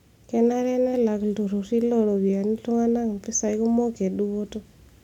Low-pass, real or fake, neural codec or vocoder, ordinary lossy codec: 19.8 kHz; real; none; none